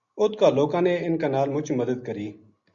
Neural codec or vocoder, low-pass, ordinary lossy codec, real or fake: none; 7.2 kHz; Opus, 64 kbps; real